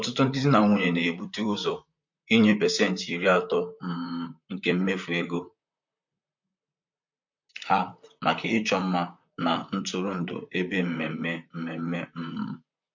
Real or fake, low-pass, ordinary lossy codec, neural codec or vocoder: fake; 7.2 kHz; MP3, 48 kbps; vocoder, 44.1 kHz, 128 mel bands, Pupu-Vocoder